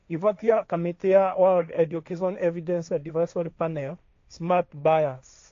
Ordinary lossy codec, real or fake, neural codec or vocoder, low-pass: AAC, 48 kbps; fake; codec, 16 kHz, 1.1 kbps, Voila-Tokenizer; 7.2 kHz